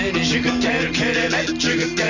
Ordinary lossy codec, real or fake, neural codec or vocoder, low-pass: none; real; none; 7.2 kHz